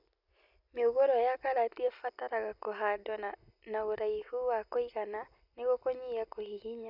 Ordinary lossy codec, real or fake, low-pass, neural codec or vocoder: none; real; 5.4 kHz; none